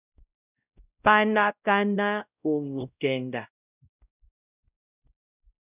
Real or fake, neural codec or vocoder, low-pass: fake; codec, 16 kHz, 0.5 kbps, X-Codec, WavLM features, trained on Multilingual LibriSpeech; 3.6 kHz